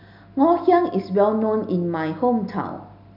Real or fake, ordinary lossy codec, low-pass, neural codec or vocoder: real; none; 5.4 kHz; none